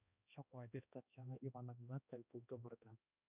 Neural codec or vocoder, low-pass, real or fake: codec, 16 kHz, 1 kbps, X-Codec, HuBERT features, trained on balanced general audio; 3.6 kHz; fake